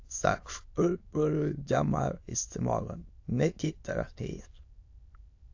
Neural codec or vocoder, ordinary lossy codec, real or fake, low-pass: autoencoder, 22.05 kHz, a latent of 192 numbers a frame, VITS, trained on many speakers; AAC, 48 kbps; fake; 7.2 kHz